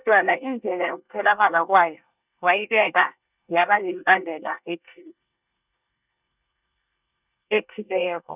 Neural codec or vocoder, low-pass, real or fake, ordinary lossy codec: codec, 24 kHz, 1 kbps, SNAC; 3.6 kHz; fake; none